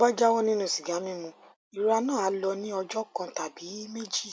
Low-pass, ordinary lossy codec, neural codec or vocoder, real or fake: none; none; none; real